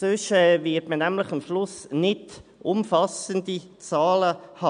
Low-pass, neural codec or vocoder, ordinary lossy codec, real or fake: 9.9 kHz; none; none; real